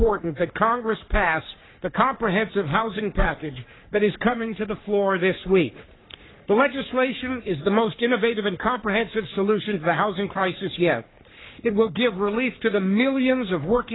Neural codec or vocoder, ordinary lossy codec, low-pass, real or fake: codec, 44.1 kHz, 3.4 kbps, Pupu-Codec; AAC, 16 kbps; 7.2 kHz; fake